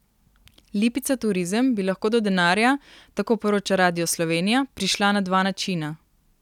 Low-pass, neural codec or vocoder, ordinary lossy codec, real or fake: 19.8 kHz; none; none; real